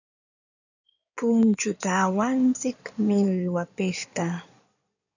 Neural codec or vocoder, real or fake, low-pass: codec, 16 kHz in and 24 kHz out, 2.2 kbps, FireRedTTS-2 codec; fake; 7.2 kHz